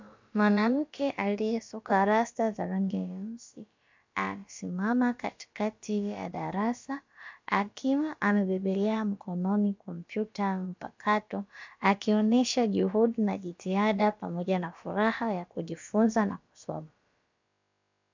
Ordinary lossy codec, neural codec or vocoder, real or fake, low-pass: MP3, 64 kbps; codec, 16 kHz, about 1 kbps, DyCAST, with the encoder's durations; fake; 7.2 kHz